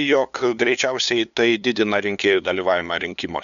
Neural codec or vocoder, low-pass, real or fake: codec, 16 kHz, 2 kbps, FunCodec, trained on LibriTTS, 25 frames a second; 7.2 kHz; fake